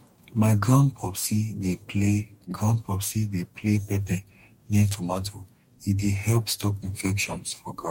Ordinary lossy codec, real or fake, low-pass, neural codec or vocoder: MP3, 64 kbps; fake; 19.8 kHz; codec, 44.1 kHz, 2.6 kbps, DAC